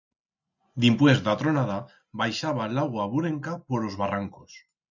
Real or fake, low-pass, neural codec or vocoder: real; 7.2 kHz; none